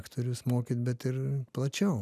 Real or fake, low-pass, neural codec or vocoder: real; 14.4 kHz; none